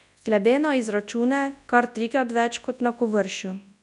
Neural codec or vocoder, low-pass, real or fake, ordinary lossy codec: codec, 24 kHz, 0.9 kbps, WavTokenizer, large speech release; 10.8 kHz; fake; none